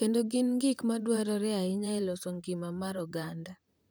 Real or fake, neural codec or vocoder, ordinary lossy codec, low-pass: fake; vocoder, 44.1 kHz, 128 mel bands, Pupu-Vocoder; none; none